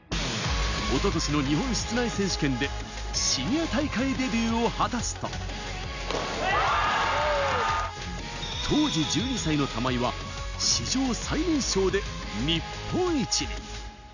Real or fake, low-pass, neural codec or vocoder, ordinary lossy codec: real; 7.2 kHz; none; none